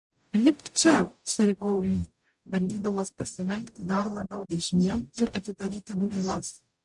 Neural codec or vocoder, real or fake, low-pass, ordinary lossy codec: codec, 44.1 kHz, 0.9 kbps, DAC; fake; 10.8 kHz; MP3, 64 kbps